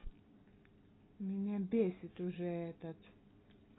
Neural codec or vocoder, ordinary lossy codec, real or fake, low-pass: none; AAC, 16 kbps; real; 7.2 kHz